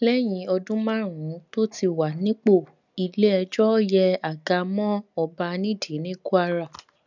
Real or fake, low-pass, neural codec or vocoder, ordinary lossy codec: real; 7.2 kHz; none; none